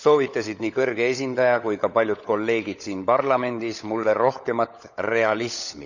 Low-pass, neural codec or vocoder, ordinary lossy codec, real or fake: 7.2 kHz; codec, 16 kHz, 16 kbps, FunCodec, trained on LibriTTS, 50 frames a second; none; fake